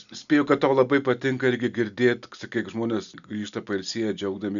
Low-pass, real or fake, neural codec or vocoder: 7.2 kHz; real; none